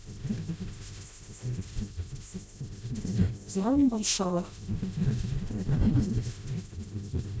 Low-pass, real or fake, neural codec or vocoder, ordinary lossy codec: none; fake; codec, 16 kHz, 0.5 kbps, FreqCodec, smaller model; none